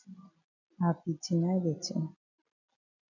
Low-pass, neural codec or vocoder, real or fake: 7.2 kHz; none; real